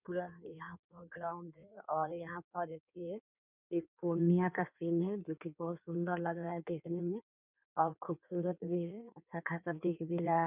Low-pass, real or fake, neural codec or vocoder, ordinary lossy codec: 3.6 kHz; fake; codec, 16 kHz in and 24 kHz out, 1.1 kbps, FireRedTTS-2 codec; none